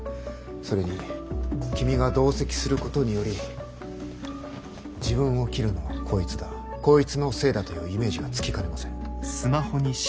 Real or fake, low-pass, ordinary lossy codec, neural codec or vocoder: real; none; none; none